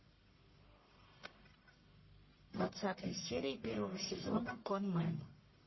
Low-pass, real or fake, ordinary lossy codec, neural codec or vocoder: 7.2 kHz; fake; MP3, 24 kbps; codec, 44.1 kHz, 1.7 kbps, Pupu-Codec